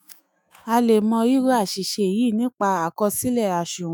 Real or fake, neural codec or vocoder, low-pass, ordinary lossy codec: fake; autoencoder, 48 kHz, 128 numbers a frame, DAC-VAE, trained on Japanese speech; none; none